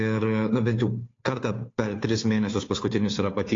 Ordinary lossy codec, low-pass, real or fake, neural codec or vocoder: AAC, 32 kbps; 7.2 kHz; fake; codec, 16 kHz, 2 kbps, FunCodec, trained on Chinese and English, 25 frames a second